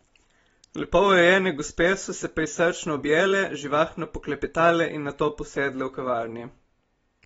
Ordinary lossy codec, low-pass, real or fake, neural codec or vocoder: AAC, 24 kbps; 19.8 kHz; real; none